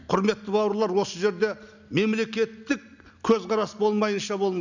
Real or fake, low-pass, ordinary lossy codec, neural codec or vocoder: real; 7.2 kHz; none; none